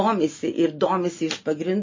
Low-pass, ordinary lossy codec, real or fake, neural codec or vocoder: 7.2 kHz; MP3, 32 kbps; real; none